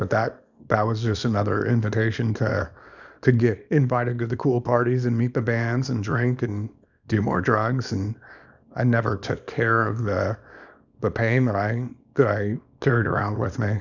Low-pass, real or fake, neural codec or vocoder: 7.2 kHz; fake; codec, 24 kHz, 0.9 kbps, WavTokenizer, small release